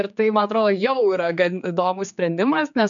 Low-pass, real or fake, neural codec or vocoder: 7.2 kHz; fake; codec, 16 kHz, 4 kbps, X-Codec, HuBERT features, trained on general audio